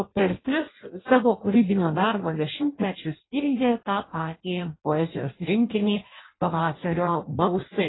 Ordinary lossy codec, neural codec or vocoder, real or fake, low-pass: AAC, 16 kbps; codec, 16 kHz in and 24 kHz out, 0.6 kbps, FireRedTTS-2 codec; fake; 7.2 kHz